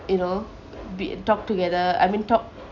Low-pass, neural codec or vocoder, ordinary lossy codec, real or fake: 7.2 kHz; none; none; real